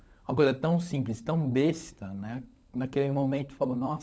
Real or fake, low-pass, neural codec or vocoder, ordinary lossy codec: fake; none; codec, 16 kHz, 16 kbps, FunCodec, trained on LibriTTS, 50 frames a second; none